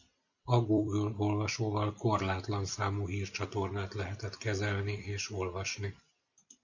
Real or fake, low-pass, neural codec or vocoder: fake; 7.2 kHz; vocoder, 44.1 kHz, 128 mel bands every 256 samples, BigVGAN v2